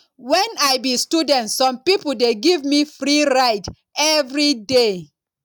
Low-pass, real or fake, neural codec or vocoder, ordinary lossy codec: 19.8 kHz; real; none; none